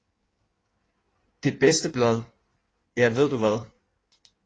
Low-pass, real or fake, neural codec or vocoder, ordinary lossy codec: 9.9 kHz; fake; codec, 16 kHz in and 24 kHz out, 1.1 kbps, FireRedTTS-2 codec; AAC, 32 kbps